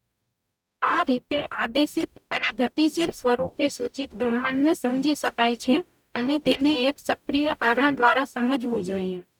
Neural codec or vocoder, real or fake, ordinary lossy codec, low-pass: codec, 44.1 kHz, 0.9 kbps, DAC; fake; none; 19.8 kHz